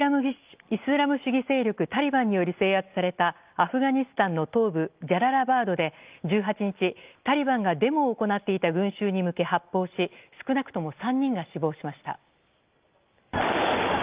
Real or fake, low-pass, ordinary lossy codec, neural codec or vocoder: real; 3.6 kHz; Opus, 32 kbps; none